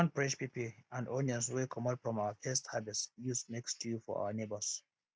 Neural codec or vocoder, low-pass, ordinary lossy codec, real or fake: none; none; none; real